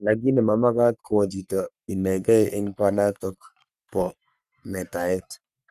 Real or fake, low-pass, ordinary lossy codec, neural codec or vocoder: fake; 14.4 kHz; none; codec, 44.1 kHz, 3.4 kbps, Pupu-Codec